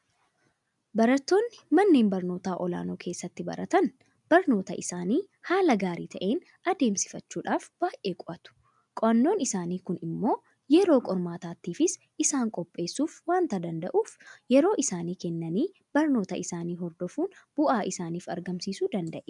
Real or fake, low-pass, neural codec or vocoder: real; 10.8 kHz; none